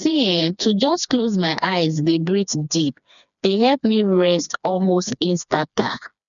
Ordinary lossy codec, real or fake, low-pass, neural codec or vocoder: none; fake; 7.2 kHz; codec, 16 kHz, 2 kbps, FreqCodec, smaller model